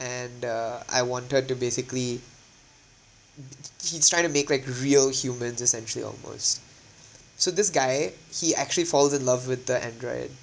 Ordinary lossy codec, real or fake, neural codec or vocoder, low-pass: none; real; none; none